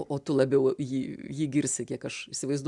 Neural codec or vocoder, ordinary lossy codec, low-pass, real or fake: none; MP3, 96 kbps; 10.8 kHz; real